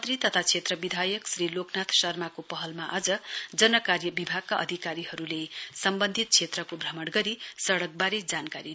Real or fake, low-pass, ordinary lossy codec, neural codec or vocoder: real; none; none; none